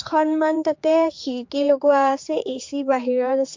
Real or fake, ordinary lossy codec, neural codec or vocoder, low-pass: fake; MP3, 48 kbps; codec, 16 kHz, 4 kbps, X-Codec, HuBERT features, trained on general audio; 7.2 kHz